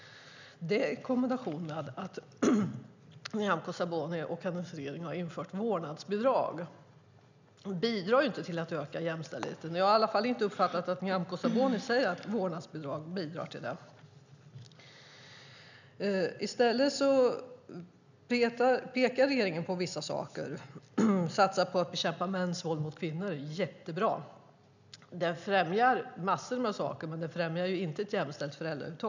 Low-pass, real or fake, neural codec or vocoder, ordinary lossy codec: 7.2 kHz; real; none; none